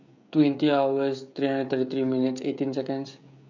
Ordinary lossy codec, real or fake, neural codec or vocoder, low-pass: none; fake; codec, 16 kHz, 16 kbps, FreqCodec, smaller model; 7.2 kHz